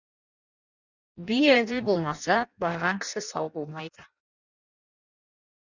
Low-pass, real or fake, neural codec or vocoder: 7.2 kHz; fake; codec, 16 kHz in and 24 kHz out, 0.6 kbps, FireRedTTS-2 codec